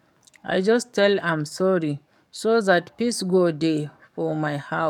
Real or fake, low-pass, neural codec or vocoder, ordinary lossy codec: fake; 19.8 kHz; codec, 44.1 kHz, 7.8 kbps, DAC; none